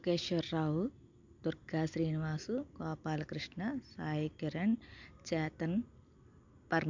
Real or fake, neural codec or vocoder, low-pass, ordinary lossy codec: real; none; 7.2 kHz; MP3, 64 kbps